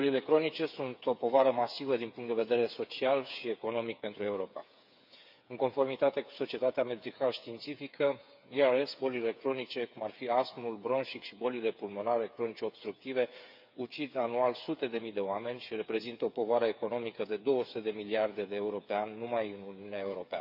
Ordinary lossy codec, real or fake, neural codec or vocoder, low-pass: none; fake; codec, 16 kHz, 8 kbps, FreqCodec, smaller model; 5.4 kHz